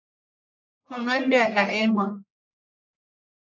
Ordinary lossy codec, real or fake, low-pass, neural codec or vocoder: AAC, 48 kbps; fake; 7.2 kHz; codec, 44.1 kHz, 1.7 kbps, Pupu-Codec